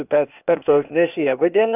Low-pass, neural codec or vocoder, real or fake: 3.6 kHz; codec, 16 kHz, 0.8 kbps, ZipCodec; fake